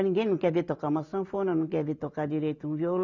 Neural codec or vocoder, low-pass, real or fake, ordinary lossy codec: none; 7.2 kHz; real; none